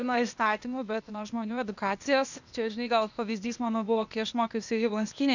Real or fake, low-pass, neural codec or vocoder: fake; 7.2 kHz; codec, 16 kHz, 0.8 kbps, ZipCodec